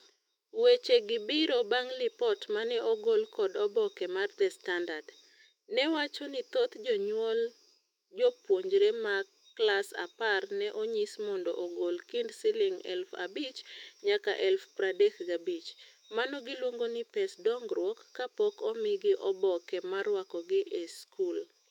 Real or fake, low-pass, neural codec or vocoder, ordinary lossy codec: fake; 19.8 kHz; autoencoder, 48 kHz, 128 numbers a frame, DAC-VAE, trained on Japanese speech; none